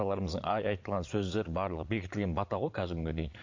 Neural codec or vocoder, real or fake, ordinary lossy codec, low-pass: vocoder, 22.05 kHz, 80 mel bands, Vocos; fake; MP3, 48 kbps; 7.2 kHz